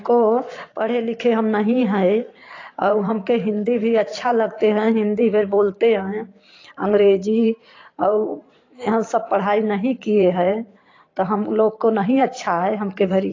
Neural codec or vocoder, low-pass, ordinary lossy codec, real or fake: vocoder, 44.1 kHz, 128 mel bands every 512 samples, BigVGAN v2; 7.2 kHz; AAC, 32 kbps; fake